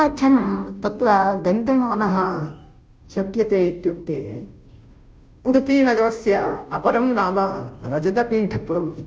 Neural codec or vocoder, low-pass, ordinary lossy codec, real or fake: codec, 16 kHz, 0.5 kbps, FunCodec, trained on Chinese and English, 25 frames a second; none; none; fake